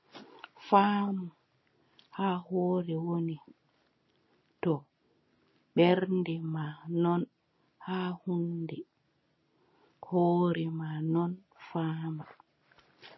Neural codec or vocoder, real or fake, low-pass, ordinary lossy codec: none; real; 7.2 kHz; MP3, 24 kbps